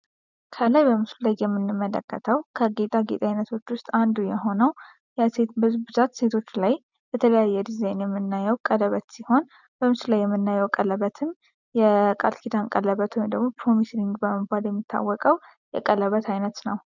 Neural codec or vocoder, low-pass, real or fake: none; 7.2 kHz; real